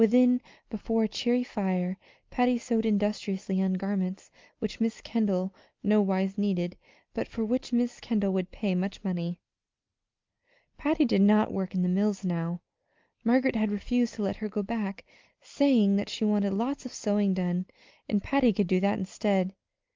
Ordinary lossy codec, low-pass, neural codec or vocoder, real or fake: Opus, 24 kbps; 7.2 kHz; none; real